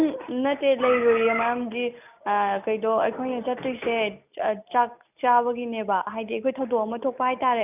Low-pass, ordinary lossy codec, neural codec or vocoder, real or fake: 3.6 kHz; none; none; real